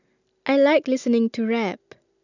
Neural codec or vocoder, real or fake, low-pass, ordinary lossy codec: none; real; 7.2 kHz; none